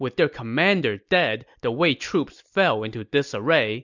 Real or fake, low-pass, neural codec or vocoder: real; 7.2 kHz; none